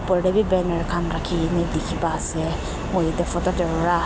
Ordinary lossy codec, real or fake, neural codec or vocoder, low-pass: none; real; none; none